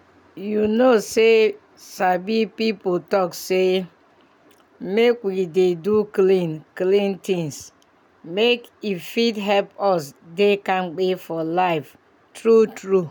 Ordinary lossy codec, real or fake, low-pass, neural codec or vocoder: none; real; 19.8 kHz; none